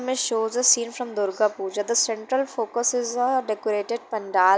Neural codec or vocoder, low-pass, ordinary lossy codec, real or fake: none; none; none; real